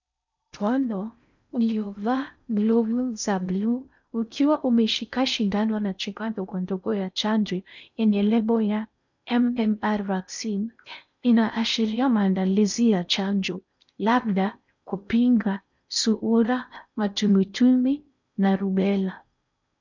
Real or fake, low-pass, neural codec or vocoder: fake; 7.2 kHz; codec, 16 kHz in and 24 kHz out, 0.6 kbps, FocalCodec, streaming, 4096 codes